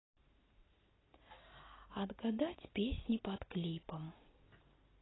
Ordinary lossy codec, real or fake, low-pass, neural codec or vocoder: AAC, 16 kbps; real; 7.2 kHz; none